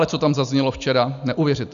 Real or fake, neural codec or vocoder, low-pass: real; none; 7.2 kHz